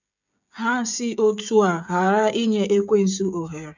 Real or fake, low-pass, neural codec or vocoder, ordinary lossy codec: fake; 7.2 kHz; codec, 16 kHz, 16 kbps, FreqCodec, smaller model; none